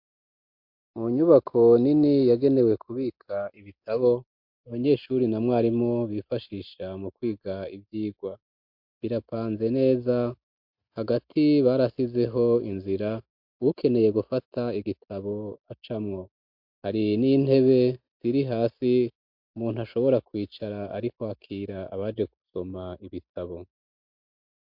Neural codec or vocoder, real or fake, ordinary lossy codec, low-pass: none; real; MP3, 48 kbps; 5.4 kHz